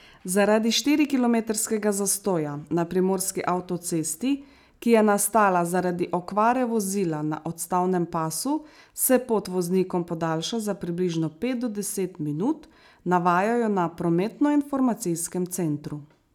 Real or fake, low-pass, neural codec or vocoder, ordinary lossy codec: real; 19.8 kHz; none; none